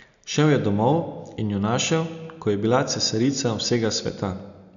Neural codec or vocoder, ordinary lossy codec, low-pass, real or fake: none; none; 7.2 kHz; real